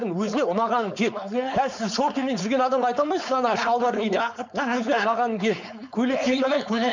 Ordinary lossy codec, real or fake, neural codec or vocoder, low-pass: MP3, 64 kbps; fake; codec, 16 kHz, 4.8 kbps, FACodec; 7.2 kHz